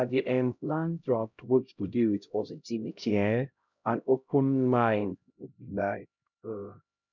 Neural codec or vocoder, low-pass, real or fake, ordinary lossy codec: codec, 16 kHz, 0.5 kbps, X-Codec, HuBERT features, trained on LibriSpeech; 7.2 kHz; fake; none